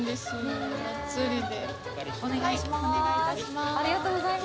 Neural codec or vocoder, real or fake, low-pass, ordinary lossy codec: none; real; none; none